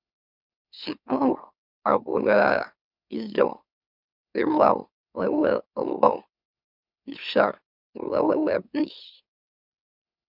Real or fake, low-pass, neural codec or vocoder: fake; 5.4 kHz; autoencoder, 44.1 kHz, a latent of 192 numbers a frame, MeloTTS